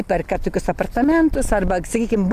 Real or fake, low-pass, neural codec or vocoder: real; 14.4 kHz; none